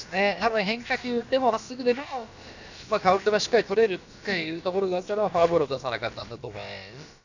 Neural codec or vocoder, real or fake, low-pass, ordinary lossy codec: codec, 16 kHz, about 1 kbps, DyCAST, with the encoder's durations; fake; 7.2 kHz; none